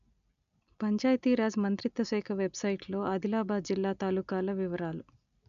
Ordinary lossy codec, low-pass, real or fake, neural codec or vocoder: none; 7.2 kHz; real; none